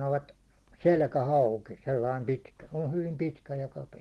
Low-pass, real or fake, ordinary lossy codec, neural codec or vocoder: 10.8 kHz; real; Opus, 16 kbps; none